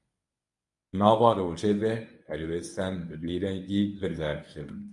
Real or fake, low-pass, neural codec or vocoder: fake; 10.8 kHz; codec, 24 kHz, 0.9 kbps, WavTokenizer, medium speech release version 1